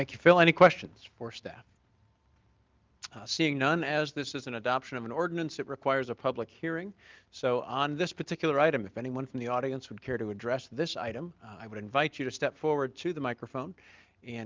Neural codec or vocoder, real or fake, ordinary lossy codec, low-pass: none; real; Opus, 32 kbps; 7.2 kHz